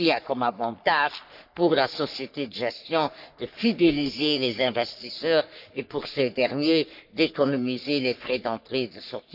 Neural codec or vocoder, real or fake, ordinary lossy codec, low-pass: codec, 44.1 kHz, 3.4 kbps, Pupu-Codec; fake; none; 5.4 kHz